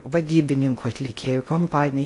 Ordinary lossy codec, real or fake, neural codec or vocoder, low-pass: AAC, 48 kbps; fake; codec, 16 kHz in and 24 kHz out, 0.6 kbps, FocalCodec, streaming, 4096 codes; 10.8 kHz